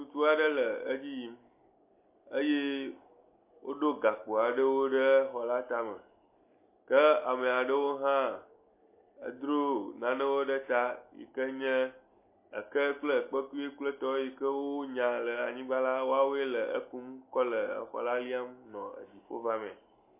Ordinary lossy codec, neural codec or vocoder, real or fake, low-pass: MP3, 24 kbps; none; real; 3.6 kHz